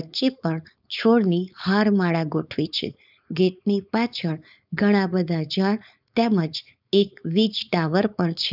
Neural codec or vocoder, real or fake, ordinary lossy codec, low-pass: codec, 16 kHz, 4.8 kbps, FACodec; fake; none; 5.4 kHz